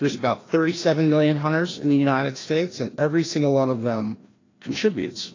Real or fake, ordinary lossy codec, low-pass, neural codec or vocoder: fake; AAC, 32 kbps; 7.2 kHz; codec, 16 kHz, 1 kbps, FreqCodec, larger model